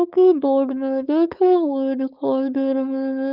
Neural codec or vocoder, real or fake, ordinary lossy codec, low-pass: codec, 16 kHz, 4.8 kbps, FACodec; fake; Opus, 24 kbps; 5.4 kHz